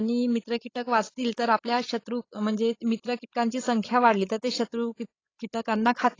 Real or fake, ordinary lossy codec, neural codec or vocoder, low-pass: fake; AAC, 32 kbps; codec, 16 kHz, 16 kbps, FreqCodec, larger model; 7.2 kHz